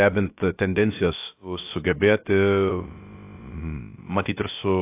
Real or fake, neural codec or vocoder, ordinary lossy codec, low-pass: fake; codec, 16 kHz, about 1 kbps, DyCAST, with the encoder's durations; AAC, 24 kbps; 3.6 kHz